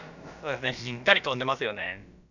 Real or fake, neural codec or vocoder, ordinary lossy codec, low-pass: fake; codec, 16 kHz, about 1 kbps, DyCAST, with the encoder's durations; none; 7.2 kHz